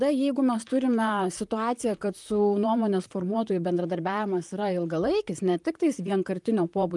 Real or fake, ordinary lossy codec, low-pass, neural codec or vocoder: fake; Opus, 32 kbps; 10.8 kHz; vocoder, 44.1 kHz, 128 mel bands, Pupu-Vocoder